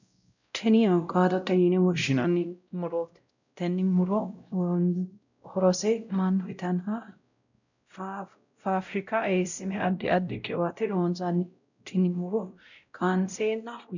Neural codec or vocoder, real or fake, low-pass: codec, 16 kHz, 0.5 kbps, X-Codec, WavLM features, trained on Multilingual LibriSpeech; fake; 7.2 kHz